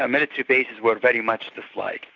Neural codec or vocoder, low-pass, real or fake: none; 7.2 kHz; real